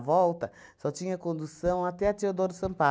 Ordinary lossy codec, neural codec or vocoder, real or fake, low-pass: none; none; real; none